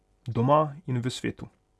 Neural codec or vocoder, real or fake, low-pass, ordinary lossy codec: none; real; none; none